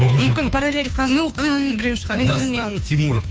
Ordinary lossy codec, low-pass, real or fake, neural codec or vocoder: none; none; fake; codec, 16 kHz, 2 kbps, FunCodec, trained on Chinese and English, 25 frames a second